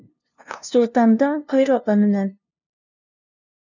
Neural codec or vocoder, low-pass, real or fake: codec, 16 kHz, 0.5 kbps, FunCodec, trained on LibriTTS, 25 frames a second; 7.2 kHz; fake